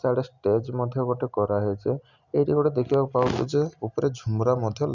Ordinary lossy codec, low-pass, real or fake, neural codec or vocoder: none; 7.2 kHz; real; none